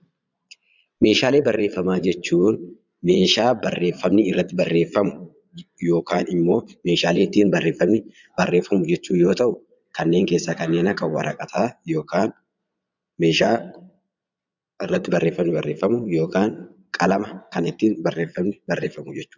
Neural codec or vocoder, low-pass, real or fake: vocoder, 22.05 kHz, 80 mel bands, Vocos; 7.2 kHz; fake